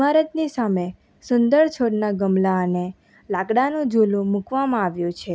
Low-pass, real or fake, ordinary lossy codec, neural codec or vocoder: none; real; none; none